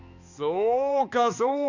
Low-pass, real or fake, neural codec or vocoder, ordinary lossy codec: 7.2 kHz; fake; codec, 16 kHz, 2 kbps, X-Codec, HuBERT features, trained on balanced general audio; none